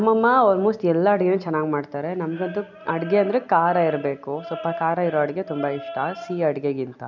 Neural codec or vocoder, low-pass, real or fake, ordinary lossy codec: none; 7.2 kHz; real; none